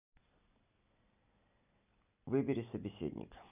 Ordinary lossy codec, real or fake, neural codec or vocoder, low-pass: none; real; none; 3.6 kHz